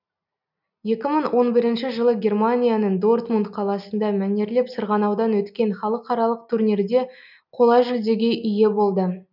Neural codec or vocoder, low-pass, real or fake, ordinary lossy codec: none; 5.4 kHz; real; none